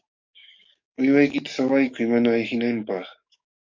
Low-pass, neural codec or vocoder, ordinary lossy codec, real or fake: 7.2 kHz; codec, 44.1 kHz, 7.8 kbps, DAC; MP3, 48 kbps; fake